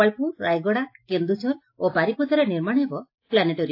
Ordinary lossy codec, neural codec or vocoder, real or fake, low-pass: AAC, 32 kbps; none; real; 5.4 kHz